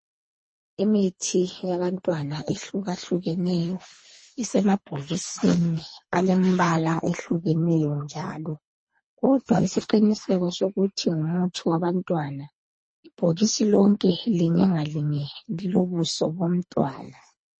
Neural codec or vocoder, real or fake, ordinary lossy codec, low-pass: codec, 24 kHz, 3 kbps, HILCodec; fake; MP3, 32 kbps; 9.9 kHz